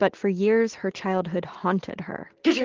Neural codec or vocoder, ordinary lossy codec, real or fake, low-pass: none; Opus, 16 kbps; real; 7.2 kHz